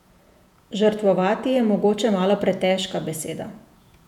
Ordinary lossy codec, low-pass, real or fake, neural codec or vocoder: none; 19.8 kHz; fake; vocoder, 48 kHz, 128 mel bands, Vocos